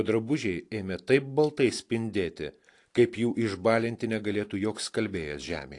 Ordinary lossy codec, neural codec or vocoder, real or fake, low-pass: AAC, 48 kbps; none; real; 10.8 kHz